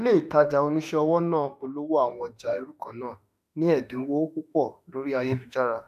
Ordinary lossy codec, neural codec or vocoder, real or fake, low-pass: none; autoencoder, 48 kHz, 32 numbers a frame, DAC-VAE, trained on Japanese speech; fake; 14.4 kHz